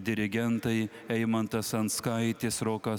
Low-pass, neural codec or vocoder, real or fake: 19.8 kHz; none; real